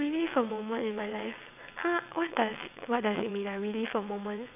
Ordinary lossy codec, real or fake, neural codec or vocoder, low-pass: none; fake; vocoder, 22.05 kHz, 80 mel bands, WaveNeXt; 3.6 kHz